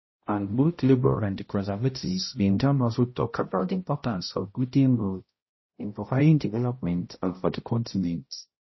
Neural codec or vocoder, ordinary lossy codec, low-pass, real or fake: codec, 16 kHz, 0.5 kbps, X-Codec, HuBERT features, trained on balanced general audio; MP3, 24 kbps; 7.2 kHz; fake